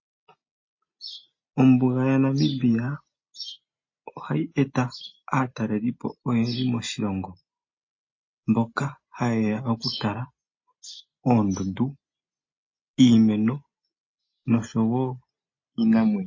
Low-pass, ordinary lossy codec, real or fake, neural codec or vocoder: 7.2 kHz; MP3, 32 kbps; real; none